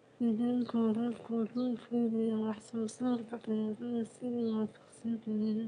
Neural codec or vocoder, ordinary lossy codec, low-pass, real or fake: autoencoder, 22.05 kHz, a latent of 192 numbers a frame, VITS, trained on one speaker; none; 9.9 kHz; fake